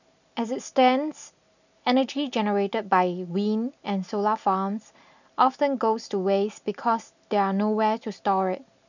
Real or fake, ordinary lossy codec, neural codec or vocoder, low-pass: real; none; none; 7.2 kHz